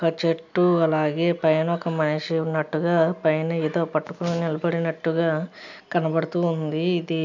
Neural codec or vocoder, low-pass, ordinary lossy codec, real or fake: none; 7.2 kHz; none; real